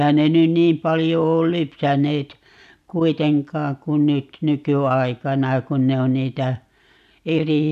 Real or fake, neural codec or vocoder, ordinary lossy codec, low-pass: real; none; none; 14.4 kHz